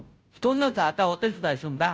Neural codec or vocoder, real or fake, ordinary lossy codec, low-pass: codec, 16 kHz, 0.5 kbps, FunCodec, trained on Chinese and English, 25 frames a second; fake; none; none